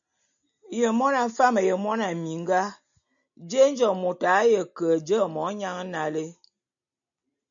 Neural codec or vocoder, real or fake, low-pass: none; real; 7.2 kHz